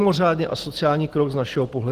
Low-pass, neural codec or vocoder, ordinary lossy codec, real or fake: 14.4 kHz; none; Opus, 16 kbps; real